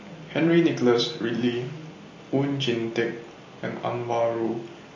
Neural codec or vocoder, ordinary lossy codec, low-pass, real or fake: none; MP3, 32 kbps; 7.2 kHz; real